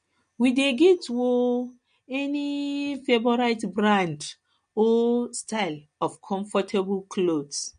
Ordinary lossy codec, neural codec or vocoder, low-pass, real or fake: MP3, 48 kbps; none; 9.9 kHz; real